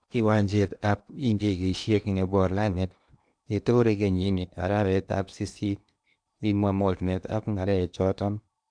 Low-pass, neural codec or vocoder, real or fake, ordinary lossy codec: 9.9 kHz; codec, 16 kHz in and 24 kHz out, 0.8 kbps, FocalCodec, streaming, 65536 codes; fake; none